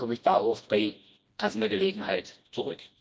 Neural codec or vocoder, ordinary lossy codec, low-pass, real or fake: codec, 16 kHz, 1 kbps, FreqCodec, smaller model; none; none; fake